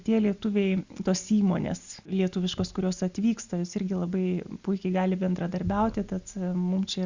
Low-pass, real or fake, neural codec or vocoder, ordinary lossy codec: 7.2 kHz; real; none; Opus, 64 kbps